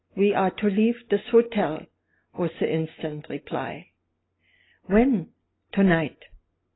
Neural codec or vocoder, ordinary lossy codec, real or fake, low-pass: none; AAC, 16 kbps; real; 7.2 kHz